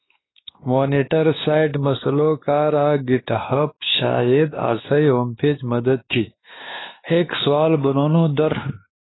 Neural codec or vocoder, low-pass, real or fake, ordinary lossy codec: codec, 16 kHz, 2 kbps, X-Codec, WavLM features, trained on Multilingual LibriSpeech; 7.2 kHz; fake; AAC, 16 kbps